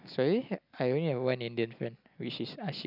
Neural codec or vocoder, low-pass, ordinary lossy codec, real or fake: none; 5.4 kHz; none; real